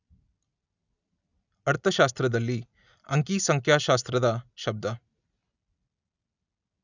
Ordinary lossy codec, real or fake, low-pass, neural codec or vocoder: none; real; 7.2 kHz; none